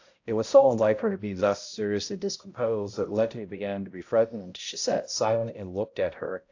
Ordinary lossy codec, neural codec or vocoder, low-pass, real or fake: AAC, 48 kbps; codec, 16 kHz, 0.5 kbps, X-Codec, HuBERT features, trained on balanced general audio; 7.2 kHz; fake